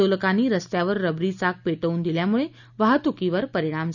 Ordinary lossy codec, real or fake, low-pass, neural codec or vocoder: none; real; 7.2 kHz; none